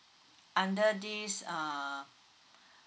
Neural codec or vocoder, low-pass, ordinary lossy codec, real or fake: none; none; none; real